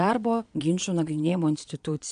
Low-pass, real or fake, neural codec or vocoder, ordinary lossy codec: 9.9 kHz; fake; vocoder, 22.05 kHz, 80 mel bands, Vocos; AAC, 64 kbps